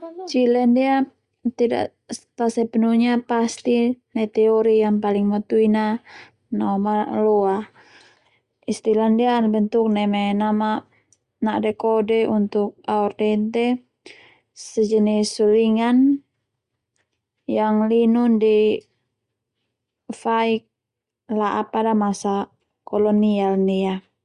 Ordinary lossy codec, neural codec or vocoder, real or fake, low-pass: Opus, 64 kbps; none; real; 10.8 kHz